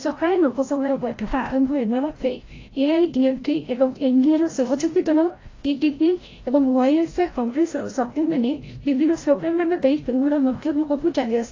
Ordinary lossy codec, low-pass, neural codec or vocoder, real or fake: AAC, 32 kbps; 7.2 kHz; codec, 16 kHz, 0.5 kbps, FreqCodec, larger model; fake